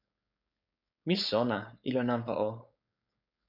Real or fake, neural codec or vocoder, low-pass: fake; codec, 16 kHz, 4.8 kbps, FACodec; 5.4 kHz